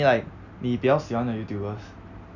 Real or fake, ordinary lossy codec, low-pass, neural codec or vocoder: real; none; 7.2 kHz; none